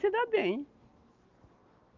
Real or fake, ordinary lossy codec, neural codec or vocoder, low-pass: real; Opus, 24 kbps; none; 7.2 kHz